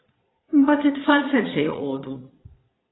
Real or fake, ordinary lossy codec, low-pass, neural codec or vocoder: fake; AAC, 16 kbps; 7.2 kHz; vocoder, 22.05 kHz, 80 mel bands, Vocos